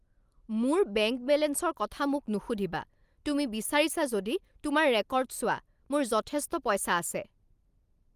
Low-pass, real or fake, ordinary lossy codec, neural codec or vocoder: 14.4 kHz; real; Opus, 32 kbps; none